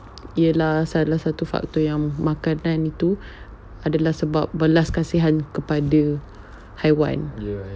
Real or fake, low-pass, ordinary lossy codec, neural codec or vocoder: real; none; none; none